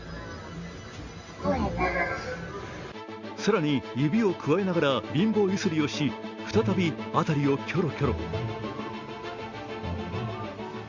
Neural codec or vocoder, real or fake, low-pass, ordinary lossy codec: none; real; 7.2 kHz; Opus, 64 kbps